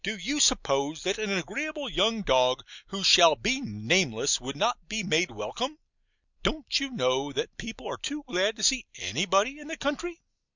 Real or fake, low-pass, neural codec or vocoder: real; 7.2 kHz; none